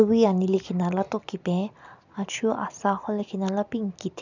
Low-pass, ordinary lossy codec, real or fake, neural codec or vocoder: 7.2 kHz; none; real; none